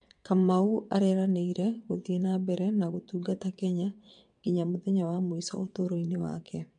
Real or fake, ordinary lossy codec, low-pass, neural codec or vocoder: fake; MP3, 64 kbps; 9.9 kHz; vocoder, 22.05 kHz, 80 mel bands, WaveNeXt